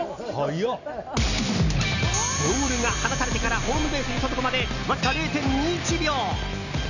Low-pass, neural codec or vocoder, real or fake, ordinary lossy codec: 7.2 kHz; none; real; none